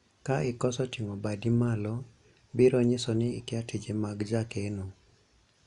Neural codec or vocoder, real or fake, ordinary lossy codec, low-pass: none; real; none; 10.8 kHz